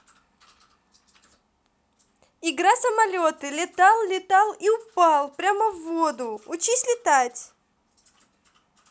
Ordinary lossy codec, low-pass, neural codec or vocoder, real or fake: none; none; none; real